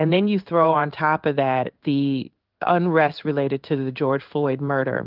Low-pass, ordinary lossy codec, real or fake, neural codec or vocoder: 5.4 kHz; Opus, 32 kbps; fake; codec, 16 kHz in and 24 kHz out, 1 kbps, XY-Tokenizer